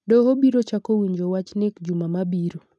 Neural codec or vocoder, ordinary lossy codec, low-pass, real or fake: none; none; none; real